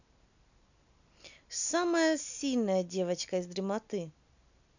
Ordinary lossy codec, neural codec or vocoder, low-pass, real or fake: none; none; 7.2 kHz; real